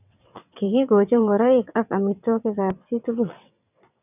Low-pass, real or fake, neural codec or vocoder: 3.6 kHz; fake; vocoder, 22.05 kHz, 80 mel bands, WaveNeXt